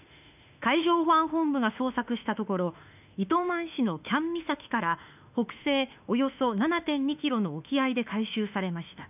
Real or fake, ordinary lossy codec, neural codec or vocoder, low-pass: fake; none; autoencoder, 48 kHz, 32 numbers a frame, DAC-VAE, trained on Japanese speech; 3.6 kHz